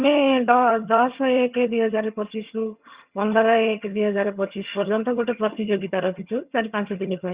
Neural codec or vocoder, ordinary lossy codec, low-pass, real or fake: vocoder, 22.05 kHz, 80 mel bands, HiFi-GAN; Opus, 64 kbps; 3.6 kHz; fake